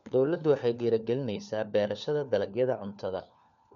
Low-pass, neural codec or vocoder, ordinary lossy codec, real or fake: 7.2 kHz; codec, 16 kHz, 4 kbps, FunCodec, trained on LibriTTS, 50 frames a second; none; fake